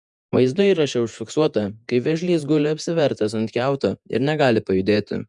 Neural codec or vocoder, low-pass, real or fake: vocoder, 48 kHz, 128 mel bands, Vocos; 10.8 kHz; fake